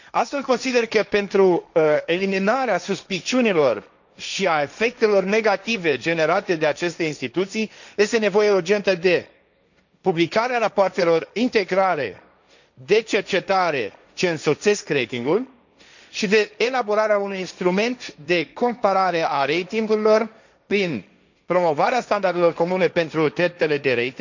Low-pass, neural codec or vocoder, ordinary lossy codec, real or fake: 7.2 kHz; codec, 16 kHz, 1.1 kbps, Voila-Tokenizer; none; fake